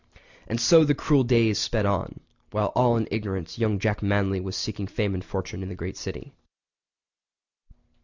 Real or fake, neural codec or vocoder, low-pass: real; none; 7.2 kHz